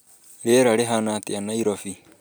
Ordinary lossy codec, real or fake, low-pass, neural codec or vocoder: none; real; none; none